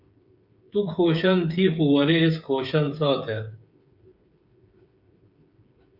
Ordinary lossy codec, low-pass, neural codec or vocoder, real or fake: Opus, 64 kbps; 5.4 kHz; codec, 16 kHz, 8 kbps, FreqCodec, smaller model; fake